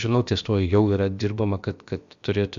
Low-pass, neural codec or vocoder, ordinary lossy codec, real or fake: 7.2 kHz; codec, 16 kHz, about 1 kbps, DyCAST, with the encoder's durations; Opus, 64 kbps; fake